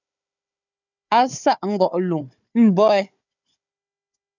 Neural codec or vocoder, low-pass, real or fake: codec, 16 kHz, 4 kbps, FunCodec, trained on Chinese and English, 50 frames a second; 7.2 kHz; fake